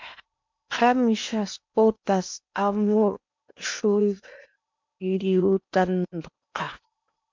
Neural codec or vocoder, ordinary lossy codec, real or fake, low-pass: codec, 16 kHz in and 24 kHz out, 0.8 kbps, FocalCodec, streaming, 65536 codes; MP3, 64 kbps; fake; 7.2 kHz